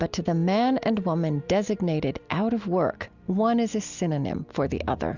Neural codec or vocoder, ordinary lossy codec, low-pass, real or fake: none; Opus, 64 kbps; 7.2 kHz; real